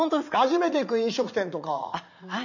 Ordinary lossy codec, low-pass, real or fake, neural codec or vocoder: none; 7.2 kHz; fake; vocoder, 44.1 kHz, 80 mel bands, Vocos